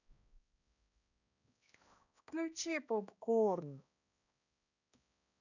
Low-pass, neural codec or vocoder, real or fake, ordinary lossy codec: 7.2 kHz; codec, 16 kHz, 1 kbps, X-Codec, HuBERT features, trained on balanced general audio; fake; none